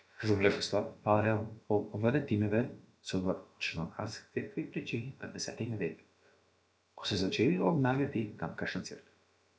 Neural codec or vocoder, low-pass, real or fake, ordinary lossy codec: codec, 16 kHz, about 1 kbps, DyCAST, with the encoder's durations; none; fake; none